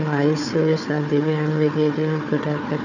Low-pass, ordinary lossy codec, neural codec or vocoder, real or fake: 7.2 kHz; none; codec, 16 kHz, 4 kbps, FunCodec, trained on Chinese and English, 50 frames a second; fake